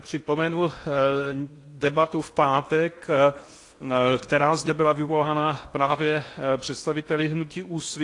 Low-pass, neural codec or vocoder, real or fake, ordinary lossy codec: 10.8 kHz; codec, 16 kHz in and 24 kHz out, 0.8 kbps, FocalCodec, streaming, 65536 codes; fake; AAC, 48 kbps